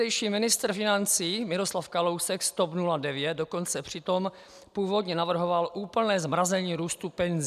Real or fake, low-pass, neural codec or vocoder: real; 14.4 kHz; none